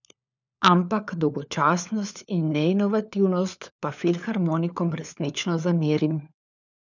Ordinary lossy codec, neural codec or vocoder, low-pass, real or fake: none; codec, 16 kHz, 4 kbps, FunCodec, trained on LibriTTS, 50 frames a second; 7.2 kHz; fake